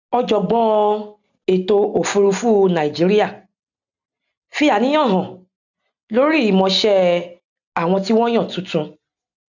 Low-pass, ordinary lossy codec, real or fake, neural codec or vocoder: 7.2 kHz; none; real; none